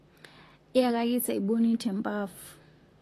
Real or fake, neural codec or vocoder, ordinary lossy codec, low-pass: fake; vocoder, 44.1 kHz, 128 mel bands, Pupu-Vocoder; AAC, 48 kbps; 14.4 kHz